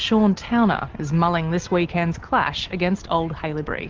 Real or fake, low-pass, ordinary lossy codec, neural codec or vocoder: real; 7.2 kHz; Opus, 16 kbps; none